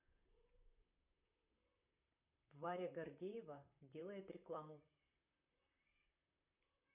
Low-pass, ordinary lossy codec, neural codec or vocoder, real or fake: 3.6 kHz; none; vocoder, 44.1 kHz, 128 mel bands, Pupu-Vocoder; fake